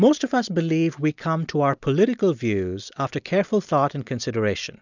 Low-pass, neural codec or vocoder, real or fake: 7.2 kHz; none; real